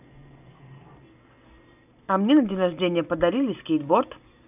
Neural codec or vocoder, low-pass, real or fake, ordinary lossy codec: none; 3.6 kHz; real; none